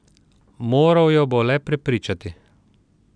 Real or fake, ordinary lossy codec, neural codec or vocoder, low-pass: real; none; none; 9.9 kHz